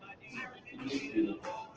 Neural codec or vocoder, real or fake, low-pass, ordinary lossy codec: none; real; 7.2 kHz; Opus, 16 kbps